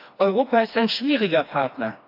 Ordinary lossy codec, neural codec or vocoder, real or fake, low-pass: AAC, 48 kbps; codec, 16 kHz, 2 kbps, FreqCodec, smaller model; fake; 5.4 kHz